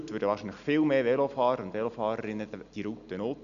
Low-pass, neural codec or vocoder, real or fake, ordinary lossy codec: 7.2 kHz; none; real; none